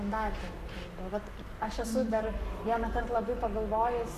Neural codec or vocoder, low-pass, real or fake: codec, 44.1 kHz, 7.8 kbps, Pupu-Codec; 14.4 kHz; fake